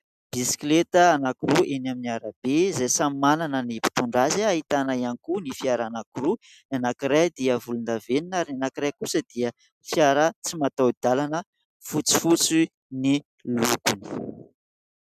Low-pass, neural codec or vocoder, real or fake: 14.4 kHz; none; real